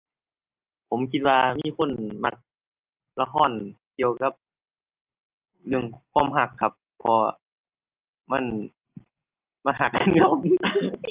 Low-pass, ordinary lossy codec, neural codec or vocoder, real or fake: 3.6 kHz; Opus, 32 kbps; none; real